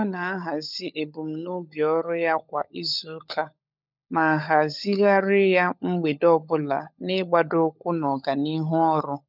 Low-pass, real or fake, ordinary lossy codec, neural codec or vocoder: 5.4 kHz; fake; none; codec, 16 kHz, 16 kbps, FunCodec, trained on LibriTTS, 50 frames a second